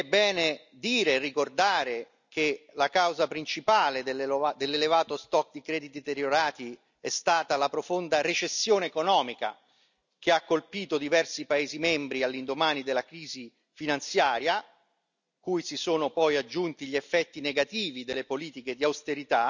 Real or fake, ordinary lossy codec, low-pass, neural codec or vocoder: real; none; 7.2 kHz; none